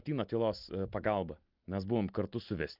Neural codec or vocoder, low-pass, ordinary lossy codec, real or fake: none; 5.4 kHz; Opus, 32 kbps; real